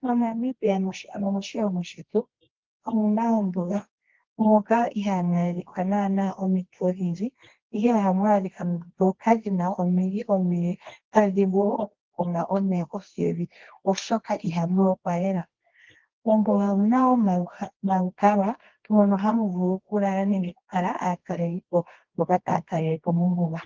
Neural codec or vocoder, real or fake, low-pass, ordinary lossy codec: codec, 24 kHz, 0.9 kbps, WavTokenizer, medium music audio release; fake; 7.2 kHz; Opus, 16 kbps